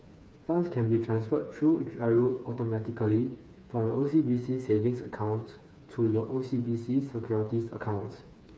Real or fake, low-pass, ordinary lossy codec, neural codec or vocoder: fake; none; none; codec, 16 kHz, 4 kbps, FreqCodec, smaller model